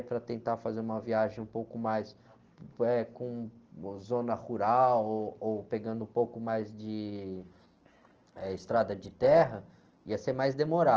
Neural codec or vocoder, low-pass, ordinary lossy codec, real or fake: none; 7.2 kHz; Opus, 16 kbps; real